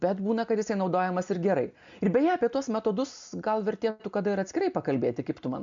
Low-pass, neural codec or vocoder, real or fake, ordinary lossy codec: 7.2 kHz; none; real; MP3, 64 kbps